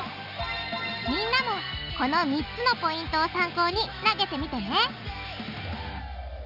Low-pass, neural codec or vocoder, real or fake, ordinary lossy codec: 5.4 kHz; none; real; none